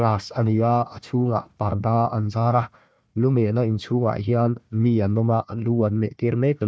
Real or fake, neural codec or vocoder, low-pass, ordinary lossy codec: fake; codec, 16 kHz, 1 kbps, FunCodec, trained on Chinese and English, 50 frames a second; none; none